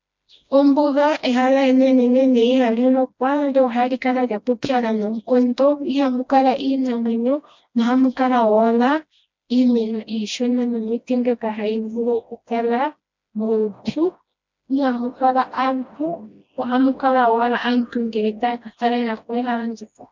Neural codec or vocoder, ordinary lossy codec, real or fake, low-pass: codec, 16 kHz, 1 kbps, FreqCodec, smaller model; MP3, 64 kbps; fake; 7.2 kHz